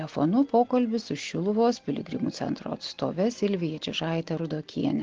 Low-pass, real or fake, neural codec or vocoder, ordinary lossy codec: 7.2 kHz; real; none; Opus, 16 kbps